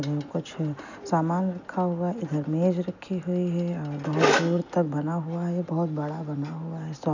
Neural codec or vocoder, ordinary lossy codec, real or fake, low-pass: none; none; real; 7.2 kHz